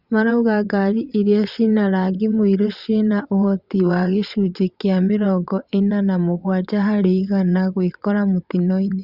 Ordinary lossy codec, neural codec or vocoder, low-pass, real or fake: Opus, 64 kbps; vocoder, 22.05 kHz, 80 mel bands, WaveNeXt; 5.4 kHz; fake